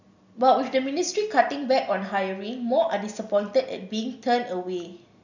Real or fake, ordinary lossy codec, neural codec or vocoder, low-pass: real; none; none; 7.2 kHz